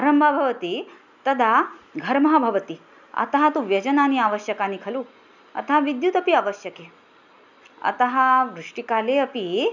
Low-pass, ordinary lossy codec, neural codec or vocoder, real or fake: 7.2 kHz; none; none; real